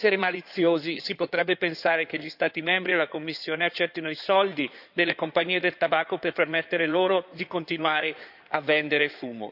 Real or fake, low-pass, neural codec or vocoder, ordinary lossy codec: fake; 5.4 kHz; codec, 16 kHz in and 24 kHz out, 2.2 kbps, FireRedTTS-2 codec; none